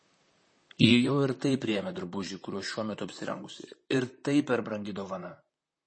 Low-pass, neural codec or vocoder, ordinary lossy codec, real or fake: 9.9 kHz; vocoder, 44.1 kHz, 128 mel bands, Pupu-Vocoder; MP3, 32 kbps; fake